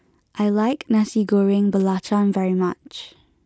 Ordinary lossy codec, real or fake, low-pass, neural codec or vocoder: none; real; none; none